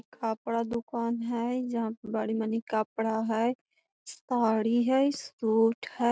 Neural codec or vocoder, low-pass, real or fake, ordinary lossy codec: none; none; real; none